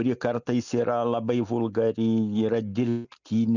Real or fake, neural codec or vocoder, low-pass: real; none; 7.2 kHz